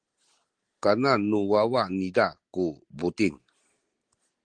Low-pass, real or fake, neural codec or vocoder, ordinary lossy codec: 9.9 kHz; real; none; Opus, 24 kbps